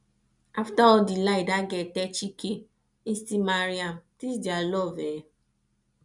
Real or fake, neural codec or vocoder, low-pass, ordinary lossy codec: real; none; 10.8 kHz; none